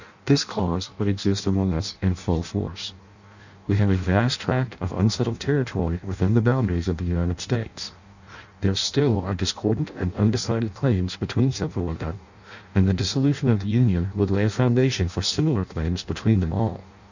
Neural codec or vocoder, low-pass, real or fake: codec, 16 kHz in and 24 kHz out, 0.6 kbps, FireRedTTS-2 codec; 7.2 kHz; fake